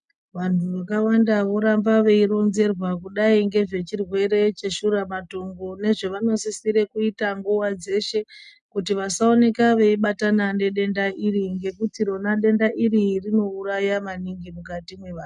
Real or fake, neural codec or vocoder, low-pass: real; none; 10.8 kHz